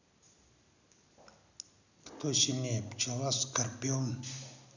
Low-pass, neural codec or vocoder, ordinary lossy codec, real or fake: 7.2 kHz; none; none; real